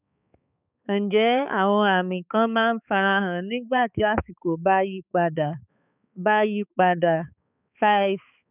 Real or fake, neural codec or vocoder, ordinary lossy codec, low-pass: fake; codec, 16 kHz, 4 kbps, X-Codec, HuBERT features, trained on balanced general audio; none; 3.6 kHz